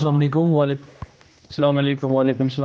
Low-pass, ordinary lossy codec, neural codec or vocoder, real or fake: none; none; codec, 16 kHz, 1 kbps, X-Codec, HuBERT features, trained on general audio; fake